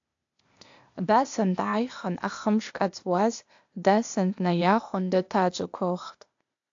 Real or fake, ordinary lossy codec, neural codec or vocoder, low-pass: fake; AAC, 64 kbps; codec, 16 kHz, 0.8 kbps, ZipCodec; 7.2 kHz